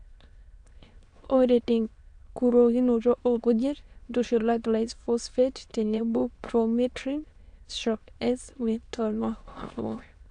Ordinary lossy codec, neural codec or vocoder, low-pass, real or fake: AAC, 64 kbps; autoencoder, 22.05 kHz, a latent of 192 numbers a frame, VITS, trained on many speakers; 9.9 kHz; fake